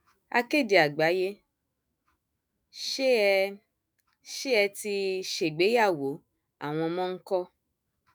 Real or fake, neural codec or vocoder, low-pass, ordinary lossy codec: fake; autoencoder, 48 kHz, 128 numbers a frame, DAC-VAE, trained on Japanese speech; none; none